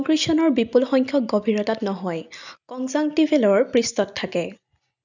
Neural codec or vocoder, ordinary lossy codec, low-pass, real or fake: none; none; 7.2 kHz; real